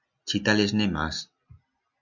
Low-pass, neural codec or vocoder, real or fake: 7.2 kHz; none; real